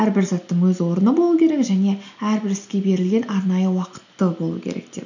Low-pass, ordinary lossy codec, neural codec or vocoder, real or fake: 7.2 kHz; none; none; real